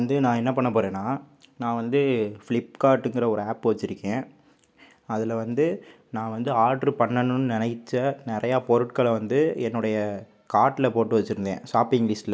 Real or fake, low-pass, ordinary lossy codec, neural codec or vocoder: real; none; none; none